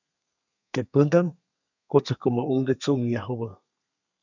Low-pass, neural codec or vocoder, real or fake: 7.2 kHz; codec, 32 kHz, 1.9 kbps, SNAC; fake